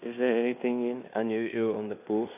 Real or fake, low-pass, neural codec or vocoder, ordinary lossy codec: fake; 3.6 kHz; codec, 16 kHz in and 24 kHz out, 0.9 kbps, LongCat-Audio-Codec, fine tuned four codebook decoder; none